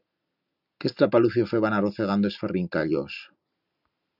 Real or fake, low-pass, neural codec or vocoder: real; 5.4 kHz; none